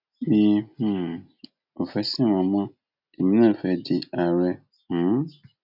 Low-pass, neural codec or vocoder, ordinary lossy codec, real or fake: 5.4 kHz; none; none; real